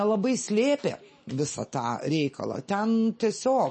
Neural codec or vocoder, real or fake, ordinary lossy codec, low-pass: none; real; MP3, 32 kbps; 10.8 kHz